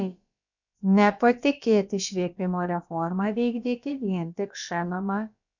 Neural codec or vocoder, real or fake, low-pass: codec, 16 kHz, about 1 kbps, DyCAST, with the encoder's durations; fake; 7.2 kHz